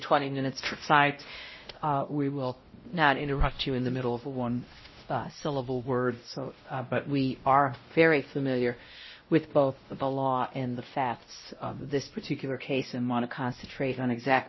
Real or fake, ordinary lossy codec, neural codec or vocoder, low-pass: fake; MP3, 24 kbps; codec, 16 kHz, 0.5 kbps, X-Codec, WavLM features, trained on Multilingual LibriSpeech; 7.2 kHz